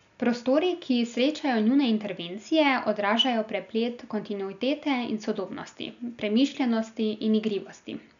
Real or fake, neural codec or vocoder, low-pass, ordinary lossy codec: real; none; 7.2 kHz; none